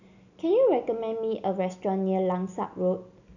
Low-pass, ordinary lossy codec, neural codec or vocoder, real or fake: 7.2 kHz; none; none; real